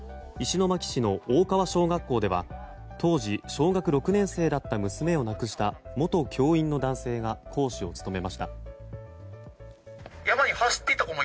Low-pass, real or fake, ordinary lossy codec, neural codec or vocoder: none; real; none; none